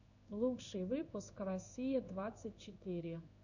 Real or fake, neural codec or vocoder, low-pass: fake; codec, 16 kHz in and 24 kHz out, 1 kbps, XY-Tokenizer; 7.2 kHz